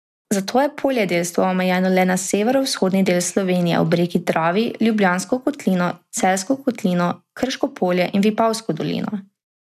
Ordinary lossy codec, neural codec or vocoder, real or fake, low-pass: none; none; real; 14.4 kHz